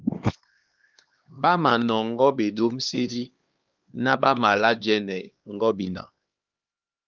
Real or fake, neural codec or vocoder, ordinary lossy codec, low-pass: fake; codec, 16 kHz, 2 kbps, X-Codec, HuBERT features, trained on LibriSpeech; Opus, 32 kbps; 7.2 kHz